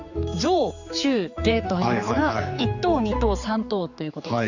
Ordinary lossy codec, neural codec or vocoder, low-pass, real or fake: none; codec, 16 kHz, 4 kbps, X-Codec, HuBERT features, trained on general audio; 7.2 kHz; fake